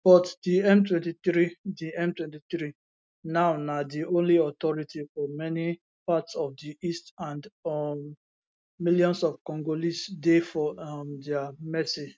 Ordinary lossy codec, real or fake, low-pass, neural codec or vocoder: none; real; none; none